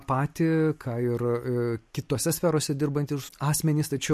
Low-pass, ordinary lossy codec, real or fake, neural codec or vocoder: 14.4 kHz; MP3, 64 kbps; real; none